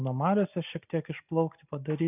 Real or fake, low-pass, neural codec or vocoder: real; 3.6 kHz; none